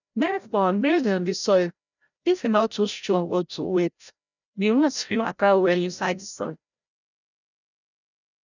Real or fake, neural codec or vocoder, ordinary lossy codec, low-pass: fake; codec, 16 kHz, 0.5 kbps, FreqCodec, larger model; none; 7.2 kHz